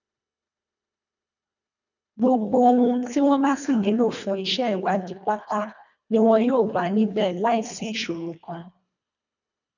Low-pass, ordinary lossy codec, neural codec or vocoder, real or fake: 7.2 kHz; none; codec, 24 kHz, 1.5 kbps, HILCodec; fake